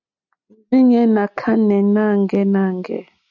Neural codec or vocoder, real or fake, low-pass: none; real; 7.2 kHz